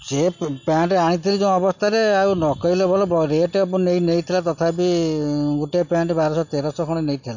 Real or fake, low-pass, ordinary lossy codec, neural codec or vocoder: real; 7.2 kHz; MP3, 48 kbps; none